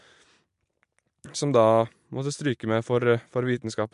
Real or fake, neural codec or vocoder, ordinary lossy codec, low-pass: real; none; MP3, 64 kbps; 10.8 kHz